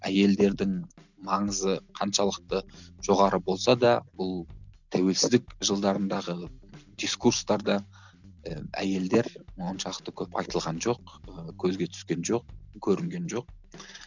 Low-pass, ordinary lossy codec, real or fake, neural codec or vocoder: 7.2 kHz; none; real; none